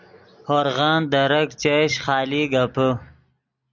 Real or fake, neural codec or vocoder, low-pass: real; none; 7.2 kHz